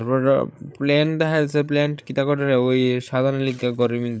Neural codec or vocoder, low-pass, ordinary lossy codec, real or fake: codec, 16 kHz, 8 kbps, FreqCodec, larger model; none; none; fake